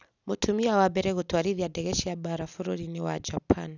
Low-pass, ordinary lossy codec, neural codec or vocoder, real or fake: 7.2 kHz; none; none; real